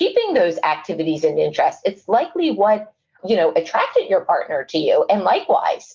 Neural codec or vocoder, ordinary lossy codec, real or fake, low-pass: none; Opus, 24 kbps; real; 7.2 kHz